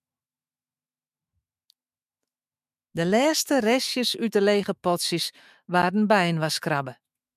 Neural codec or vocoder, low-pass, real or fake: autoencoder, 48 kHz, 128 numbers a frame, DAC-VAE, trained on Japanese speech; 14.4 kHz; fake